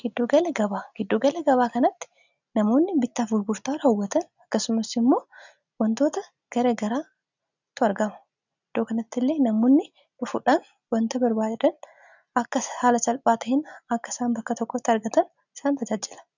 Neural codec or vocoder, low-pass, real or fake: none; 7.2 kHz; real